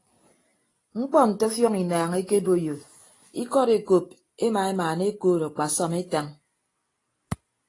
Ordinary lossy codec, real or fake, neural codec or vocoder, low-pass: AAC, 32 kbps; real; none; 10.8 kHz